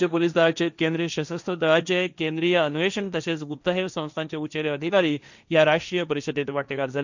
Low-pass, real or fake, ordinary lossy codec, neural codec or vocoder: 7.2 kHz; fake; none; codec, 16 kHz, 1.1 kbps, Voila-Tokenizer